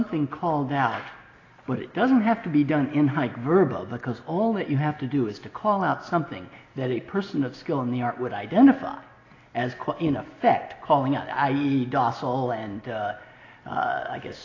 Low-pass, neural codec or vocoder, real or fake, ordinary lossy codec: 7.2 kHz; none; real; AAC, 32 kbps